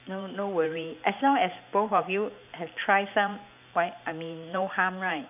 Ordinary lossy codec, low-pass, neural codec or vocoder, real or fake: none; 3.6 kHz; vocoder, 44.1 kHz, 128 mel bands every 512 samples, BigVGAN v2; fake